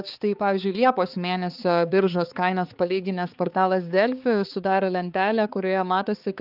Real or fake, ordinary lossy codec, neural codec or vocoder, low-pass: fake; Opus, 32 kbps; codec, 16 kHz, 4 kbps, X-Codec, HuBERT features, trained on balanced general audio; 5.4 kHz